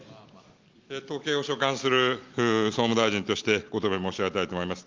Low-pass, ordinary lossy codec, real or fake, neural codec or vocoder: 7.2 kHz; Opus, 32 kbps; real; none